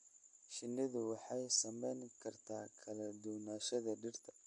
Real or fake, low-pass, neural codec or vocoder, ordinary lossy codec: real; 10.8 kHz; none; MP3, 48 kbps